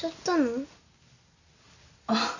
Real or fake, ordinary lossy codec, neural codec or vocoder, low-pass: real; none; none; 7.2 kHz